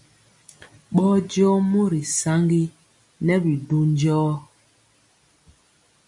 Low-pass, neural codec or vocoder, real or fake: 10.8 kHz; none; real